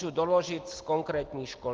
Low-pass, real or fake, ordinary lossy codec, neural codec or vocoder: 7.2 kHz; real; Opus, 16 kbps; none